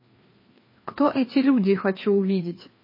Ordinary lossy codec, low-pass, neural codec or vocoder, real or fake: MP3, 24 kbps; 5.4 kHz; codec, 16 kHz, 2 kbps, FreqCodec, larger model; fake